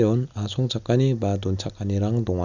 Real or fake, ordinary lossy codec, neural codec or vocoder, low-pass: real; none; none; 7.2 kHz